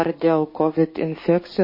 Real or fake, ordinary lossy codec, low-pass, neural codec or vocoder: fake; MP3, 24 kbps; 5.4 kHz; codec, 16 kHz, 6 kbps, DAC